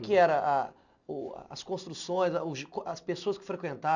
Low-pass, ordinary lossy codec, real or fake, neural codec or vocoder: 7.2 kHz; none; real; none